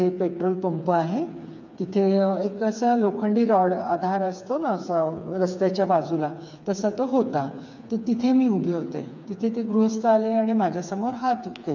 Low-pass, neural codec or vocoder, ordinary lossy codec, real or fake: 7.2 kHz; codec, 16 kHz, 4 kbps, FreqCodec, smaller model; none; fake